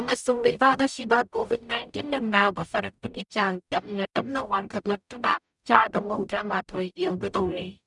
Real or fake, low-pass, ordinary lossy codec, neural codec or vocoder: fake; 10.8 kHz; none; codec, 44.1 kHz, 0.9 kbps, DAC